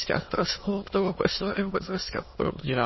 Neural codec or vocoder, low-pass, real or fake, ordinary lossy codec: autoencoder, 22.05 kHz, a latent of 192 numbers a frame, VITS, trained on many speakers; 7.2 kHz; fake; MP3, 24 kbps